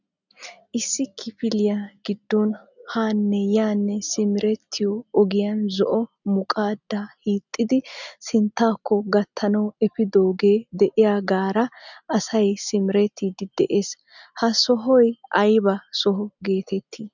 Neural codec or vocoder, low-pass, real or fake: none; 7.2 kHz; real